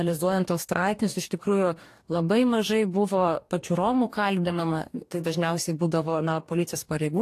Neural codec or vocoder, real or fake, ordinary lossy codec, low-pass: codec, 44.1 kHz, 2.6 kbps, DAC; fake; AAC, 64 kbps; 14.4 kHz